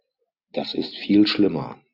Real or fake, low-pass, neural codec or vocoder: real; 5.4 kHz; none